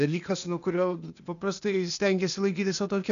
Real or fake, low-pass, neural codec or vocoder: fake; 7.2 kHz; codec, 16 kHz, 0.8 kbps, ZipCodec